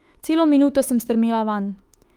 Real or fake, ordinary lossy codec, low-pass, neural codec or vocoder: fake; Opus, 32 kbps; 19.8 kHz; autoencoder, 48 kHz, 32 numbers a frame, DAC-VAE, trained on Japanese speech